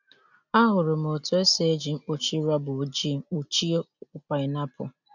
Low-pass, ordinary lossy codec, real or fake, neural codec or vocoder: 7.2 kHz; none; real; none